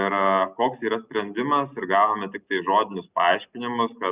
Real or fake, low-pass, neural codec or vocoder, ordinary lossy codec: real; 3.6 kHz; none; Opus, 64 kbps